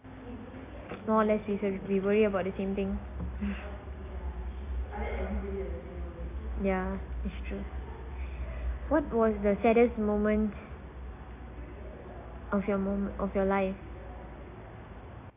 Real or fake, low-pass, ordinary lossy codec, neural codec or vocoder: real; 3.6 kHz; AAC, 24 kbps; none